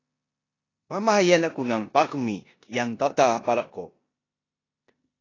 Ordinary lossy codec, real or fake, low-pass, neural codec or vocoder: AAC, 32 kbps; fake; 7.2 kHz; codec, 16 kHz in and 24 kHz out, 0.9 kbps, LongCat-Audio-Codec, four codebook decoder